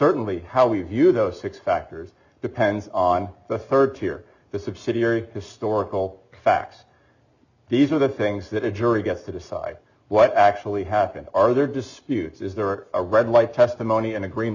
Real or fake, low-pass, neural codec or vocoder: real; 7.2 kHz; none